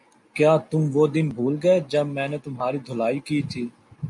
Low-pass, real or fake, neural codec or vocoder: 10.8 kHz; real; none